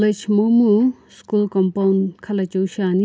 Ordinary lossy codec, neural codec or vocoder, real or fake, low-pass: none; none; real; none